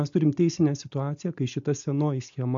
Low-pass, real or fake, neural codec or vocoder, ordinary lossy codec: 7.2 kHz; real; none; AAC, 64 kbps